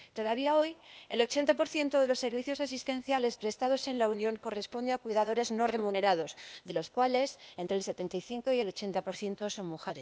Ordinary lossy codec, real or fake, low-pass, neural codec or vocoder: none; fake; none; codec, 16 kHz, 0.8 kbps, ZipCodec